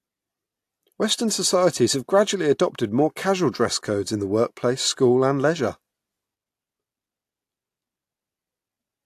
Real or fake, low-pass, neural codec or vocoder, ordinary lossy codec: real; 14.4 kHz; none; AAC, 64 kbps